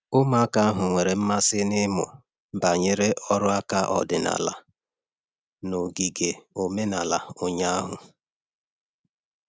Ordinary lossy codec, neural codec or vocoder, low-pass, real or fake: none; none; none; real